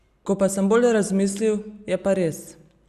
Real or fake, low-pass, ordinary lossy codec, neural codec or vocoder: real; 14.4 kHz; Opus, 64 kbps; none